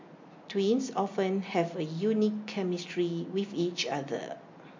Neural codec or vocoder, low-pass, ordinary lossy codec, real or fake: none; 7.2 kHz; MP3, 48 kbps; real